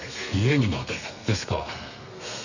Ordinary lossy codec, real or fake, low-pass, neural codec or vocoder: none; fake; 7.2 kHz; codec, 32 kHz, 1.9 kbps, SNAC